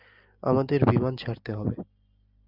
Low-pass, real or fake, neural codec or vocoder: 5.4 kHz; real; none